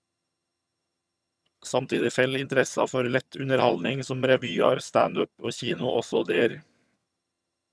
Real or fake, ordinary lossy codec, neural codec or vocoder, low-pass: fake; none; vocoder, 22.05 kHz, 80 mel bands, HiFi-GAN; none